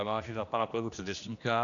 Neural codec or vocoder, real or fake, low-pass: codec, 16 kHz, 1 kbps, X-Codec, HuBERT features, trained on general audio; fake; 7.2 kHz